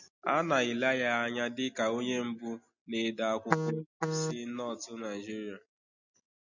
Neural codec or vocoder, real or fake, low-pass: none; real; 7.2 kHz